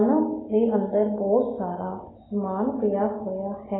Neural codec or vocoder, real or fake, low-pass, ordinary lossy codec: none; real; 7.2 kHz; AAC, 16 kbps